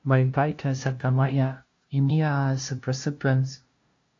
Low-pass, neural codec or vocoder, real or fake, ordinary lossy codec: 7.2 kHz; codec, 16 kHz, 0.5 kbps, FunCodec, trained on LibriTTS, 25 frames a second; fake; AAC, 48 kbps